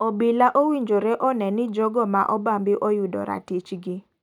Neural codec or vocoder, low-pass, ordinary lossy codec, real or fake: none; 19.8 kHz; none; real